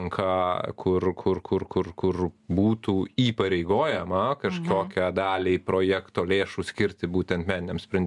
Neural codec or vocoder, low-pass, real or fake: none; 10.8 kHz; real